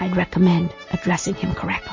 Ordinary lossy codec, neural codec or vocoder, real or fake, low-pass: MP3, 32 kbps; none; real; 7.2 kHz